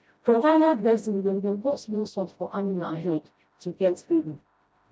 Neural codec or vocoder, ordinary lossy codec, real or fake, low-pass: codec, 16 kHz, 0.5 kbps, FreqCodec, smaller model; none; fake; none